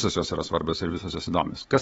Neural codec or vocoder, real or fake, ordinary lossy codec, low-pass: codec, 24 kHz, 3.1 kbps, DualCodec; fake; AAC, 24 kbps; 10.8 kHz